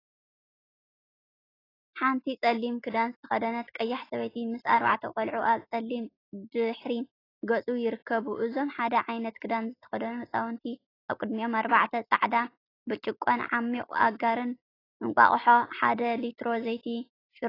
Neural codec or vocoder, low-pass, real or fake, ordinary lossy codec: none; 5.4 kHz; real; AAC, 24 kbps